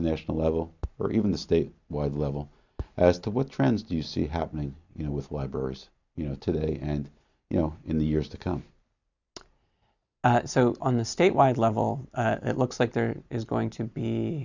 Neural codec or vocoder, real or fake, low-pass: none; real; 7.2 kHz